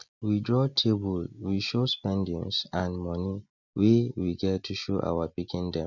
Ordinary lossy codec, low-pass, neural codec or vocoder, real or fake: none; 7.2 kHz; none; real